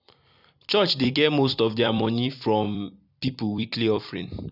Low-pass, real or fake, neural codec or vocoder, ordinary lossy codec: 5.4 kHz; fake; vocoder, 44.1 kHz, 128 mel bands every 256 samples, BigVGAN v2; none